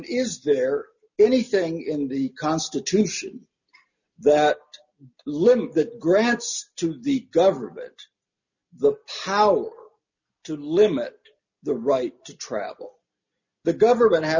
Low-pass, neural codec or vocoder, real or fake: 7.2 kHz; none; real